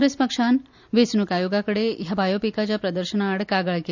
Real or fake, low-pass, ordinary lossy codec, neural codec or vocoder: real; 7.2 kHz; none; none